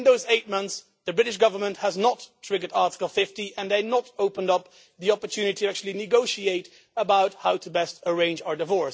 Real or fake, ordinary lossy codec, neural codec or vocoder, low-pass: real; none; none; none